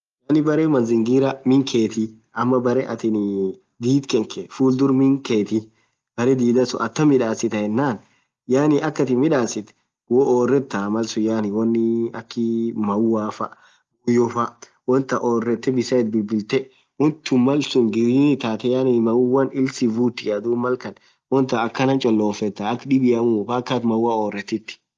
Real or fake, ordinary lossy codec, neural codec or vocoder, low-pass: real; Opus, 32 kbps; none; 7.2 kHz